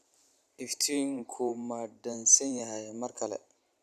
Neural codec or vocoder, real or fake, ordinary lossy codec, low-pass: vocoder, 44.1 kHz, 128 mel bands every 512 samples, BigVGAN v2; fake; none; 14.4 kHz